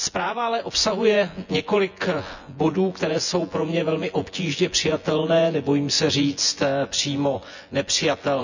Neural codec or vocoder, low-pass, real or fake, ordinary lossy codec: vocoder, 24 kHz, 100 mel bands, Vocos; 7.2 kHz; fake; none